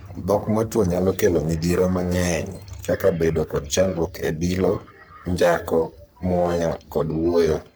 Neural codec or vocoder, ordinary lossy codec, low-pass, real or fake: codec, 44.1 kHz, 3.4 kbps, Pupu-Codec; none; none; fake